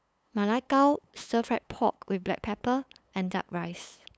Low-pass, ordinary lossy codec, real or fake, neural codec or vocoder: none; none; fake; codec, 16 kHz, 8 kbps, FunCodec, trained on LibriTTS, 25 frames a second